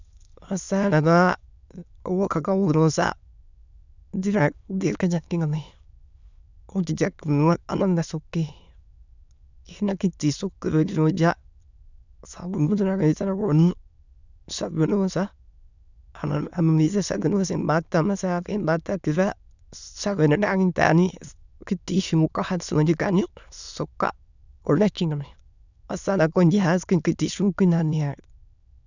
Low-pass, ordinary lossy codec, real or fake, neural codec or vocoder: 7.2 kHz; none; fake; autoencoder, 22.05 kHz, a latent of 192 numbers a frame, VITS, trained on many speakers